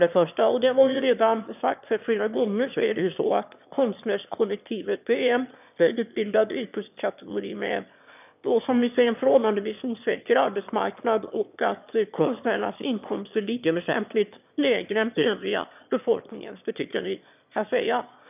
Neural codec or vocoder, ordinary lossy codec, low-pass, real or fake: autoencoder, 22.05 kHz, a latent of 192 numbers a frame, VITS, trained on one speaker; none; 3.6 kHz; fake